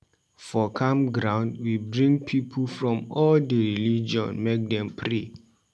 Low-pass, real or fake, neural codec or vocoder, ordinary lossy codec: none; real; none; none